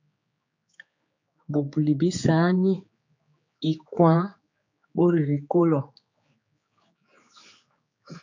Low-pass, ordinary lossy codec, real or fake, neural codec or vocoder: 7.2 kHz; MP3, 48 kbps; fake; codec, 16 kHz, 4 kbps, X-Codec, HuBERT features, trained on general audio